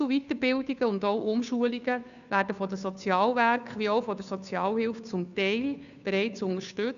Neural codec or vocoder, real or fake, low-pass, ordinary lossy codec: codec, 16 kHz, 2 kbps, FunCodec, trained on Chinese and English, 25 frames a second; fake; 7.2 kHz; none